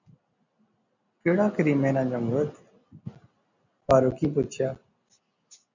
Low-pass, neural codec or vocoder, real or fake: 7.2 kHz; none; real